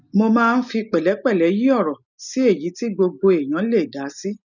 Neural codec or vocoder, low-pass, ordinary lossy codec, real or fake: none; 7.2 kHz; none; real